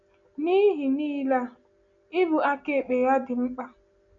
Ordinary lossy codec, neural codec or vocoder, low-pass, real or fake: none; none; 7.2 kHz; real